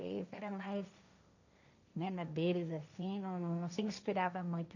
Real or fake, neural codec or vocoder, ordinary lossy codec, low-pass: fake; codec, 16 kHz, 1.1 kbps, Voila-Tokenizer; none; 7.2 kHz